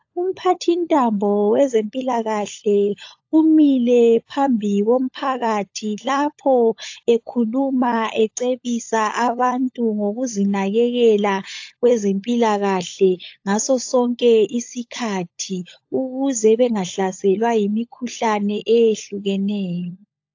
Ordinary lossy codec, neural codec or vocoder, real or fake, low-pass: AAC, 48 kbps; codec, 16 kHz, 16 kbps, FunCodec, trained on LibriTTS, 50 frames a second; fake; 7.2 kHz